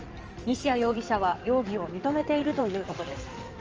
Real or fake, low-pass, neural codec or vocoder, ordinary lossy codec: fake; 7.2 kHz; codec, 16 kHz in and 24 kHz out, 2.2 kbps, FireRedTTS-2 codec; Opus, 24 kbps